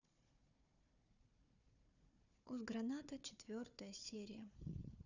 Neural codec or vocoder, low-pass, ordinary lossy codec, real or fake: codec, 16 kHz, 4 kbps, FunCodec, trained on Chinese and English, 50 frames a second; 7.2 kHz; none; fake